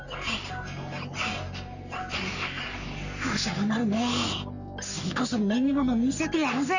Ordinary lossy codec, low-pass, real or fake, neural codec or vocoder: none; 7.2 kHz; fake; codec, 44.1 kHz, 3.4 kbps, Pupu-Codec